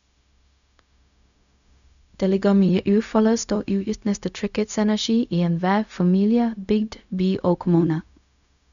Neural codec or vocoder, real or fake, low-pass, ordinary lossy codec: codec, 16 kHz, 0.4 kbps, LongCat-Audio-Codec; fake; 7.2 kHz; none